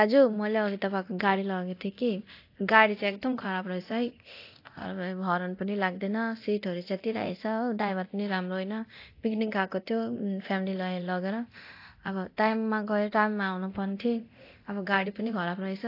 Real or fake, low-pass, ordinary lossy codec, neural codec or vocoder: fake; 5.4 kHz; AAC, 32 kbps; codec, 24 kHz, 0.9 kbps, DualCodec